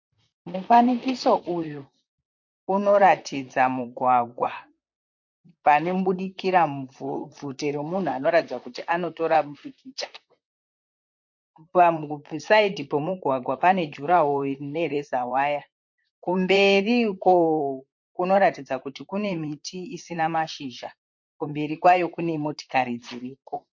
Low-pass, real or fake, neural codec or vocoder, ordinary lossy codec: 7.2 kHz; fake; vocoder, 44.1 kHz, 128 mel bands, Pupu-Vocoder; MP3, 48 kbps